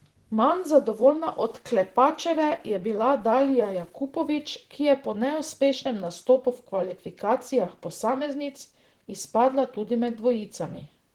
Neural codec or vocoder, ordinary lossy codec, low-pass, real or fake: vocoder, 44.1 kHz, 128 mel bands, Pupu-Vocoder; Opus, 16 kbps; 19.8 kHz; fake